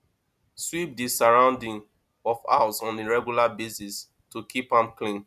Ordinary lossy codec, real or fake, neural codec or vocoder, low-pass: none; real; none; 14.4 kHz